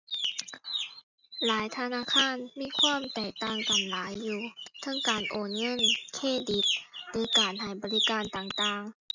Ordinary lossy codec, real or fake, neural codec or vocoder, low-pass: none; real; none; 7.2 kHz